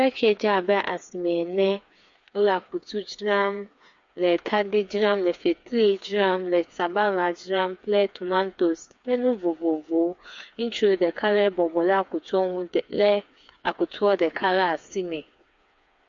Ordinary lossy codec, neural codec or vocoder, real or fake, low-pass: MP3, 48 kbps; codec, 16 kHz, 4 kbps, FreqCodec, smaller model; fake; 7.2 kHz